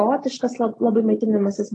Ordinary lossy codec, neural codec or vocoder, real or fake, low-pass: AAC, 32 kbps; none; real; 9.9 kHz